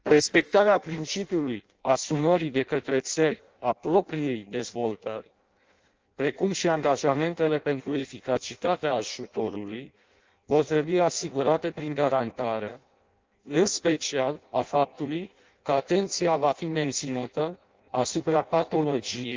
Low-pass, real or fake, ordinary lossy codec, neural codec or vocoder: 7.2 kHz; fake; Opus, 24 kbps; codec, 16 kHz in and 24 kHz out, 0.6 kbps, FireRedTTS-2 codec